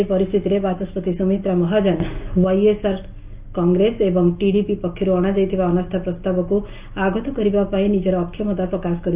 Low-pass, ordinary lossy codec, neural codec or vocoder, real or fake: 3.6 kHz; Opus, 24 kbps; none; real